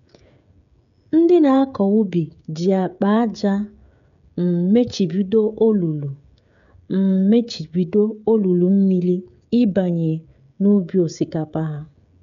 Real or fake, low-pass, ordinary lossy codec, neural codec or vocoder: fake; 7.2 kHz; none; codec, 16 kHz, 16 kbps, FreqCodec, smaller model